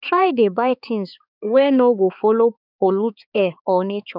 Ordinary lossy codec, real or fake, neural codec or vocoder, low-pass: none; fake; codec, 16 kHz, 4 kbps, X-Codec, HuBERT features, trained on balanced general audio; 5.4 kHz